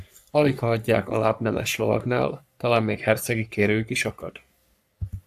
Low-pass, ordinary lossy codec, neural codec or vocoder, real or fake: 14.4 kHz; AAC, 96 kbps; codec, 44.1 kHz, 3.4 kbps, Pupu-Codec; fake